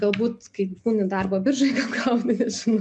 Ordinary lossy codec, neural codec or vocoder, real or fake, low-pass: MP3, 96 kbps; none; real; 9.9 kHz